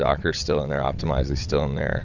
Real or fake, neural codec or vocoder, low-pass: fake; vocoder, 22.05 kHz, 80 mel bands, WaveNeXt; 7.2 kHz